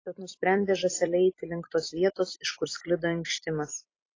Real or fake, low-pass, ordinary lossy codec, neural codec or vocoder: fake; 7.2 kHz; AAC, 32 kbps; vocoder, 44.1 kHz, 128 mel bands every 256 samples, BigVGAN v2